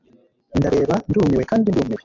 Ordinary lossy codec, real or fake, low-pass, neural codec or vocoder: MP3, 48 kbps; real; 7.2 kHz; none